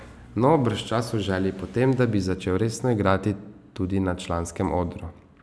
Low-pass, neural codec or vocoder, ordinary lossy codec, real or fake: none; none; none; real